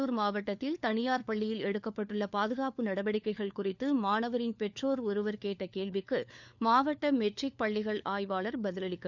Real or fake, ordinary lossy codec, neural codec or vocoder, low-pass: fake; none; codec, 16 kHz, 4 kbps, FunCodec, trained on LibriTTS, 50 frames a second; 7.2 kHz